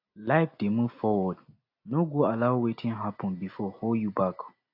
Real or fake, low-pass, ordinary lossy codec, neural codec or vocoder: real; 5.4 kHz; AAC, 32 kbps; none